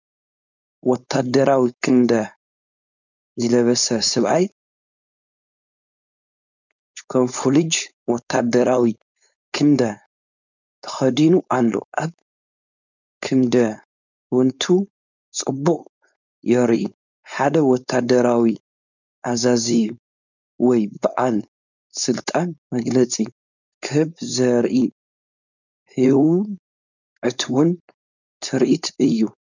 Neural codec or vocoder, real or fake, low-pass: codec, 16 kHz, 4.8 kbps, FACodec; fake; 7.2 kHz